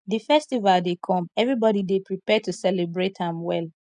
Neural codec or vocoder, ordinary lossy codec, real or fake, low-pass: none; none; real; 9.9 kHz